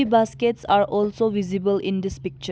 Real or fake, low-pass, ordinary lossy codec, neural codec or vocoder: real; none; none; none